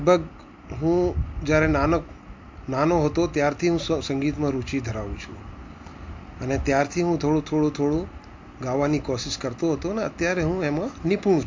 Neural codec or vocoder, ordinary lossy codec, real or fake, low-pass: none; MP3, 48 kbps; real; 7.2 kHz